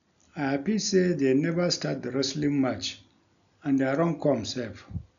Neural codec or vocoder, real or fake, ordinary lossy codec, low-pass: none; real; none; 7.2 kHz